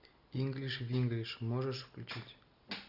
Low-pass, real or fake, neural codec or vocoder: 5.4 kHz; real; none